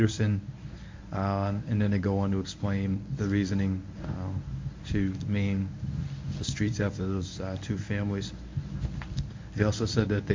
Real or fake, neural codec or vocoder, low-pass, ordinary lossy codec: fake; codec, 24 kHz, 0.9 kbps, WavTokenizer, medium speech release version 1; 7.2 kHz; MP3, 64 kbps